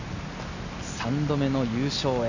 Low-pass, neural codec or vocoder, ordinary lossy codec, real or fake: 7.2 kHz; none; none; real